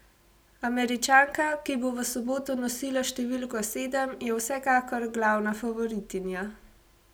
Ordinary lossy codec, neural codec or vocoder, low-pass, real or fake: none; none; none; real